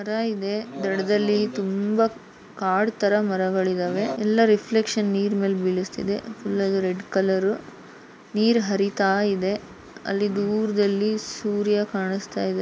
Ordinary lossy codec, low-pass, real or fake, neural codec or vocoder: none; none; real; none